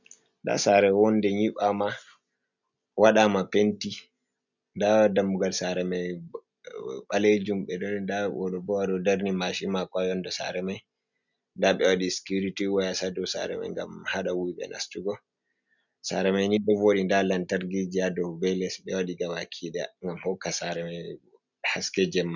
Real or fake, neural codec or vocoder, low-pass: real; none; 7.2 kHz